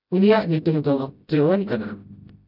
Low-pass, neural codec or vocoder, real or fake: 5.4 kHz; codec, 16 kHz, 0.5 kbps, FreqCodec, smaller model; fake